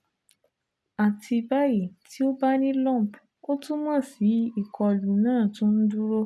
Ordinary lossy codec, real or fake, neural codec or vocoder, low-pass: none; real; none; none